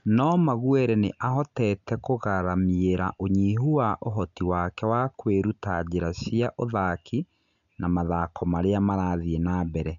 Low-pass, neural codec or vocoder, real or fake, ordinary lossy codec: 7.2 kHz; none; real; none